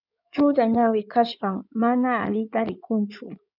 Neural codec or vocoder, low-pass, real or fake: codec, 16 kHz in and 24 kHz out, 2.2 kbps, FireRedTTS-2 codec; 5.4 kHz; fake